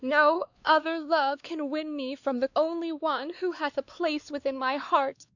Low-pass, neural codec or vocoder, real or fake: 7.2 kHz; codec, 16 kHz, 2 kbps, X-Codec, WavLM features, trained on Multilingual LibriSpeech; fake